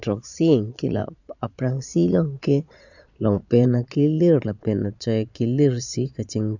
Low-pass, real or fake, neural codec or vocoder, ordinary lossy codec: 7.2 kHz; fake; vocoder, 22.05 kHz, 80 mel bands, Vocos; none